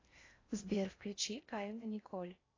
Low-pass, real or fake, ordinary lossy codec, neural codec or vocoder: 7.2 kHz; fake; AAC, 32 kbps; codec, 16 kHz in and 24 kHz out, 0.6 kbps, FocalCodec, streaming, 4096 codes